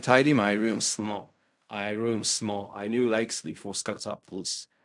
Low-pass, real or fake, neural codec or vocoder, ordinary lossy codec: 10.8 kHz; fake; codec, 16 kHz in and 24 kHz out, 0.4 kbps, LongCat-Audio-Codec, fine tuned four codebook decoder; none